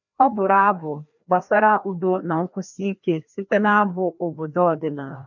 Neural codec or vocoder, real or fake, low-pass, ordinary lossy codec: codec, 16 kHz, 1 kbps, FreqCodec, larger model; fake; 7.2 kHz; none